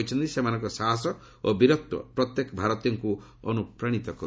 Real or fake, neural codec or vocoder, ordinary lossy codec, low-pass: real; none; none; none